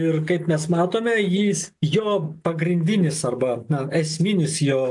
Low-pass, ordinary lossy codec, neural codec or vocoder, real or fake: 10.8 kHz; AAC, 64 kbps; none; real